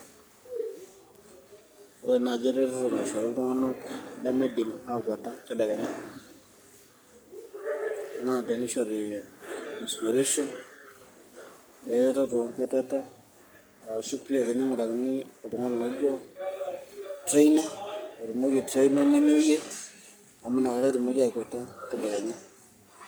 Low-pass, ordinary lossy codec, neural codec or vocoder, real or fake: none; none; codec, 44.1 kHz, 3.4 kbps, Pupu-Codec; fake